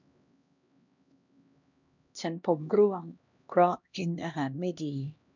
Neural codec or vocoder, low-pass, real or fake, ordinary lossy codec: codec, 16 kHz, 1 kbps, X-Codec, HuBERT features, trained on LibriSpeech; 7.2 kHz; fake; none